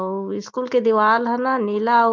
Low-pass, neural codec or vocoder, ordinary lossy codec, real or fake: 7.2 kHz; none; Opus, 24 kbps; real